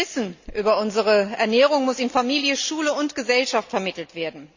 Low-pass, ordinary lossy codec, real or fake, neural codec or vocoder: 7.2 kHz; Opus, 64 kbps; real; none